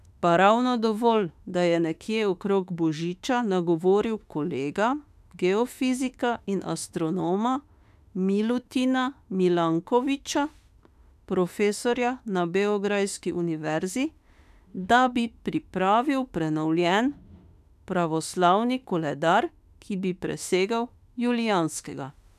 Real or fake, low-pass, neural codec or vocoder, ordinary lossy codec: fake; 14.4 kHz; autoencoder, 48 kHz, 32 numbers a frame, DAC-VAE, trained on Japanese speech; none